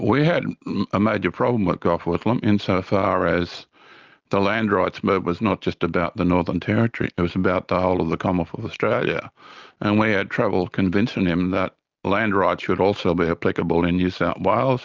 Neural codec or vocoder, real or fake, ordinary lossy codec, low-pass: none; real; Opus, 32 kbps; 7.2 kHz